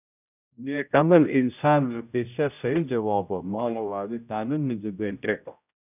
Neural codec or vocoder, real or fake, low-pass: codec, 16 kHz, 0.5 kbps, X-Codec, HuBERT features, trained on general audio; fake; 3.6 kHz